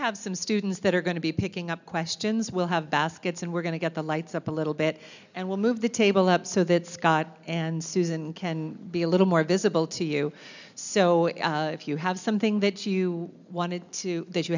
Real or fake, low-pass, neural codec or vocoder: real; 7.2 kHz; none